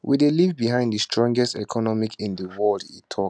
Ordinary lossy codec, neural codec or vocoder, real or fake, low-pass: none; none; real; none